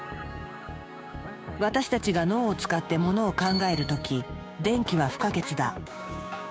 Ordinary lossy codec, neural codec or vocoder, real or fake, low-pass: none; codec, 16 kHz, 6 kbps, DAC; fake; none